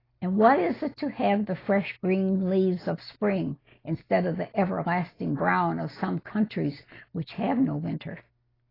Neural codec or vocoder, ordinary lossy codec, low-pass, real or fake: none; AAC, 24 kbps; 5.4 kHz; real